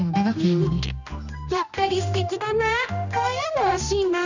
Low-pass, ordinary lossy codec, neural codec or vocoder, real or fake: 7.2 kHz; none; codec, 16 kHz, 1 kbps, X-Codec, HuBERT features, trained on general audio; fake